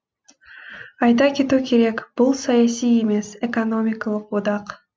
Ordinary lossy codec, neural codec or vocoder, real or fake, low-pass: none; none; real; none